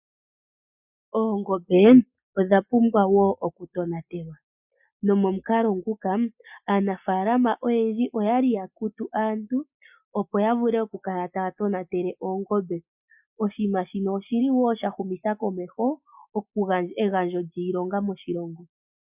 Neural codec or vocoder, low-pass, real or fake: none; 3.6 kHz; real